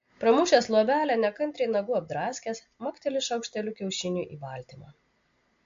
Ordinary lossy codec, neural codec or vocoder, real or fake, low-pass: MP3, 48 kbps; none; real; 7.2 kHz